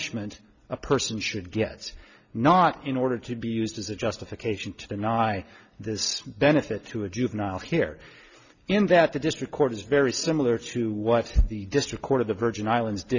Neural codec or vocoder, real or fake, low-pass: none; real; 7.2 kHz